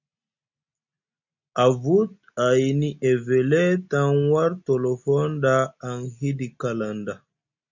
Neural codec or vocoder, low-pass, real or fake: none; 7.2 kHz; real